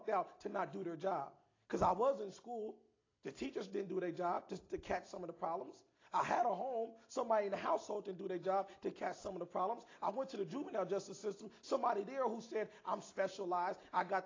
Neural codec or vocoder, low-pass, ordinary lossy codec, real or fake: none; 7.2 kHz; AAC, 32 kbps; real